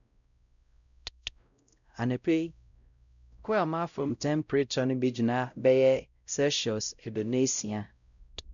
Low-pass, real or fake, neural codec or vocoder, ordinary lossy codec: 7.2 kHz; fake; codec, 16 kHz, 0.5 kbps, X-Codec, WavLM features, trained on Multilingual LibriSpeech; none